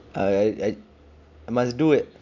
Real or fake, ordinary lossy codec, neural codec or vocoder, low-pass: real; none; none; 7.2 kHz